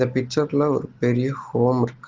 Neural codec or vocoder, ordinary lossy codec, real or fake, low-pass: none; Opus, 16 kbps; real; 7.2 kHz